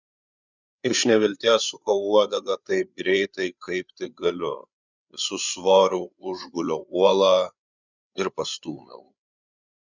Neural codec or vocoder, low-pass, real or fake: codec, 16 kHz, 8 kbps, FreqCodec, larger model; 7.2 kHz; fake